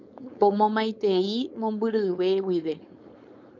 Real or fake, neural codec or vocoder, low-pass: fake; codec, 16 kHz, 4.8 kbps, FACodec; 7.2 kHz